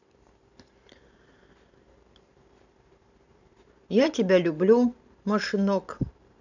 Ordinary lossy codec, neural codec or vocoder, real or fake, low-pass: none; vocoder, 44.1 kHz, 128 mel bands every 512 samples, BigVGAN v2; fake; 7.2 kHz